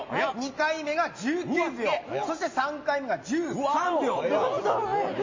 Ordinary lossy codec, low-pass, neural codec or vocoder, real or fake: MP3, 32 kbps; 7.2 kHz; none; real